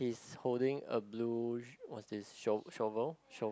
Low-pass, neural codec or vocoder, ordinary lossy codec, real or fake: none; none; none; real